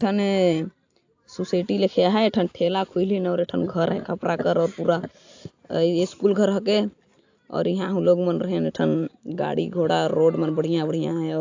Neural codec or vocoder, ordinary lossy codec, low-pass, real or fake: none; AAC, 48 kbps; 7.2 kHz; real